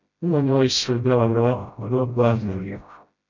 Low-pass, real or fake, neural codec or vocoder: 7.2 kHz; fake; codec, 16 kHz, 0.5 kbps, FreqCodec, smaller model